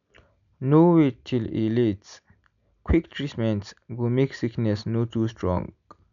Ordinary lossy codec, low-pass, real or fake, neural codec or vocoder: none; 7.2 kHz; real; none